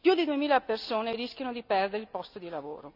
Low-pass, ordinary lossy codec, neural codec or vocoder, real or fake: 5.4 kHz; none; none; real